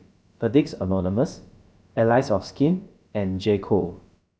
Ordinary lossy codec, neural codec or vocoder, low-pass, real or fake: none; codec, 16 kHz, about 1 kbps, DyCAST, with the encoder's durations; none; fake